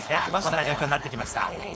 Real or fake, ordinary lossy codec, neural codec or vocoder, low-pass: fake; none; codec, 16 kHz, 4.8 kbps, FACodec; none